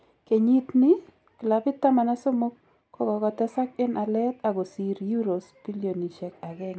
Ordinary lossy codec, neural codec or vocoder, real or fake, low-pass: none; none; real; none